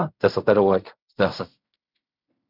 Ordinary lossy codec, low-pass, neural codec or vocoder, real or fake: none; 5.4 kHz; codec, 16 kHz in and 24 kHz out, 0.4 kbps, LongCat-Audio-Codec, fine tuned four codebook decoder; fake